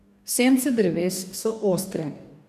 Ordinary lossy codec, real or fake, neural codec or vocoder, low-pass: none; fake; codec, 44.1 kHz, 2.6 kbps, DAC; 14.4 kHz